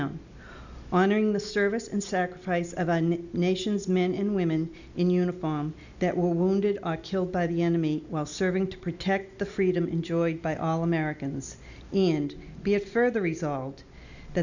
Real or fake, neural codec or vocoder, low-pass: real; none; 7.2 kHz